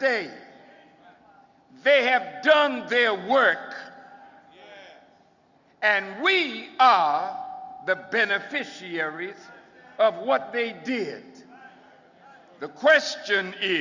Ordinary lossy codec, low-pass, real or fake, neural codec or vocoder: Opus, 64 kbps; 7.2 kHz; real; none